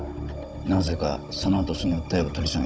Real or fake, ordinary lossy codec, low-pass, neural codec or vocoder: fake; none; none; codec, 16 kHz, 16 kbps, FunCodec, trained on Chinese and English, 50 frames a second